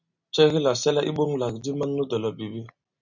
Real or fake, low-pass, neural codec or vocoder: real; 7.2 kHz; none